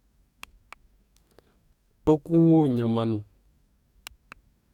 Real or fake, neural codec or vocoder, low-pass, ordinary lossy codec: fake; codec, 44.1 kHz, 2.6 kbps, DAC; 19.8 kHz; none